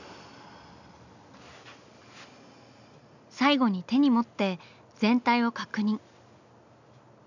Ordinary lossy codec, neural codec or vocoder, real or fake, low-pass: none; none; real; 7.2 kHz